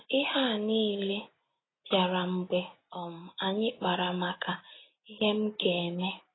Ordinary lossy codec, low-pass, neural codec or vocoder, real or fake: AAC, 16 kbps; 7.2 kHz; none; real